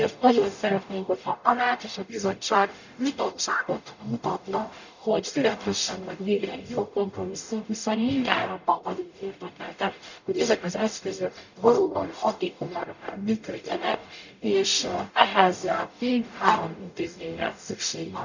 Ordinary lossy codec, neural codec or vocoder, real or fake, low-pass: none; codec, 44.1 kHz, 0.9 kbps, DAC; fake; 7.2 kHz